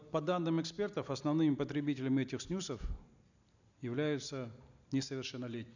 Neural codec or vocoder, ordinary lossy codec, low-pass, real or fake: none; none; 7.2 kHz; real